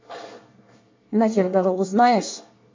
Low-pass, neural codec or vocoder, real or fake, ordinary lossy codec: 7.2 kHz; codec, 24 kHz, 1 kbps, SNAC; fake; MP3, 48 kbps